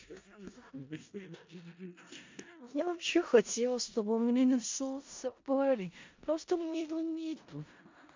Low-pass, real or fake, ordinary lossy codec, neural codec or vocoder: 7.2 kHz; fake; MP3, 48 kbps; codec, 16 kHz in and 24 kHz out, 0.4 kbps, LongCat-Audio-Codec, four codebook decoder